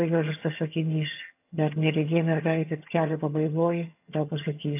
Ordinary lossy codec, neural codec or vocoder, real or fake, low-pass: AAC, 24 kbps; vocoder, 22.05 kHz, 80 mel bands, HiFi-GAN; fake; 3.6 kHz